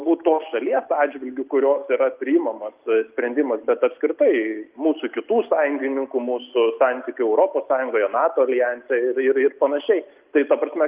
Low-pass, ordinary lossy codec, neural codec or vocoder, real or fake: 3.6 kHz; Opus, 24 kbps; none; real